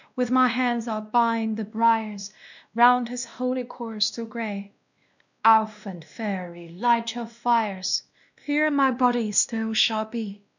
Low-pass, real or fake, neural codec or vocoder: 7.2 kHz; fake; codec, 16 kHz, 1 kbps, X-Codec, WavLM features, trained on Multilingual LibriSpeech